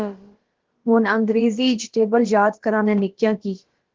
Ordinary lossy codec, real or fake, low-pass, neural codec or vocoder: Opus, 16 kbps; fake; 7.2 kHz; codec, 16 kHz, about 1 kbps, DyCAST, with the encoder's durations